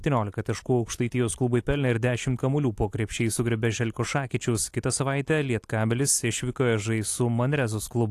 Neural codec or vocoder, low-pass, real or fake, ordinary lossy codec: none; 14.4 kHz; real; AAC, 64 kbps